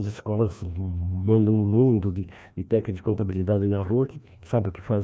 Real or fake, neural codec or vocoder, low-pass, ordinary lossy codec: fake; codec, 16 kHz, 1 kbps, FreqCodec, larger model; none; none